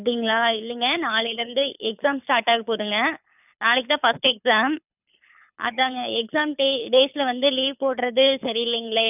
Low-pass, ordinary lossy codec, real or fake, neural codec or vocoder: 3.6 kHz; none; fake; codec, 24 kHz, 6 kbps, HILCodec